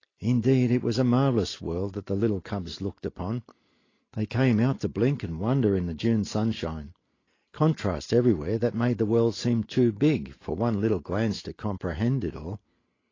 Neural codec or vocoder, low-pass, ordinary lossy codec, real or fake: none; 7.2 kHz; AAC, 32 kbps; real